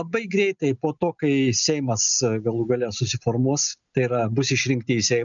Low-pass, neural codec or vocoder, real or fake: 7.2 kHz; none; real